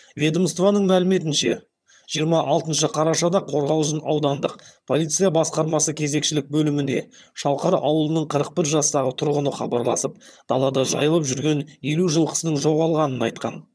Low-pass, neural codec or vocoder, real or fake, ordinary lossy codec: none; vocoder, 22.05 kHz, 80 mel bands, HiFi-GAN; fake; none